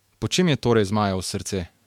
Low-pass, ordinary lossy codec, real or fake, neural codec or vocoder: 19.8 kHz; MP3, 96 kbps; fake; autoencoder, 48 kHz, 128 numbers a frame, DAC-VAE, trained on Japanese speech